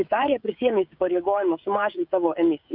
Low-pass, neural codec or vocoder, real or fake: 5.4 kHz; codec, 16 kHz, 16 kbps, FreqCodec, smaller model; fake